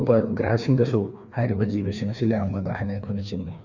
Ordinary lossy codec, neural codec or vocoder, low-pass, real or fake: none; codec, 16 kHz, 2 kbps, FreqCodec, larger model; 7.2 kHz; fake